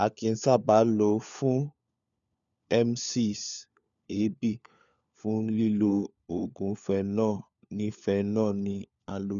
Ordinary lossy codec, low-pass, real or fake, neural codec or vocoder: none; 7.2 kHz; fake; codec, 16 kHz, 4 kbps, FunCodec, trained on LibriTTS, 50 frames a second